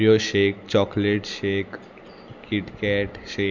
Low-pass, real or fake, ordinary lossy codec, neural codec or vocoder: 7.2 kHz; real; none; none